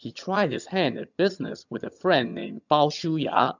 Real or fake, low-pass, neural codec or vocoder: fake; 7.2 kHz; vocoder, 22.05 kHz, 80 mel bands, HiFi-GAN